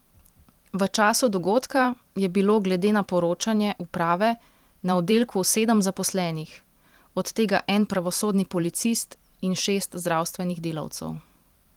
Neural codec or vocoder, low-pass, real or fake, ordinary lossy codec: vocoder, 44.1 kHz, 128 mel bands every 512 samples, BigVGAN v2; 19.8 kHz; fake; Opus, 24 kbps